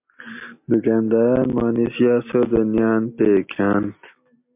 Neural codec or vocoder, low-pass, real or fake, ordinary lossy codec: none; 3.6 kHz; real; MP3, 32 kbps